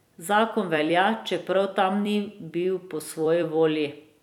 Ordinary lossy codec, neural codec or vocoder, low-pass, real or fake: none; vocoder, 44.1 kHz, 128 mel bands every 256 samples, BigVGAN v2; 19.8 kHz; fake